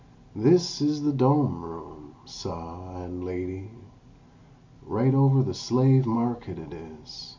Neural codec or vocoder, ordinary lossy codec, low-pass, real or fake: none; MP3, 64 kbps; 7.2 kHz; real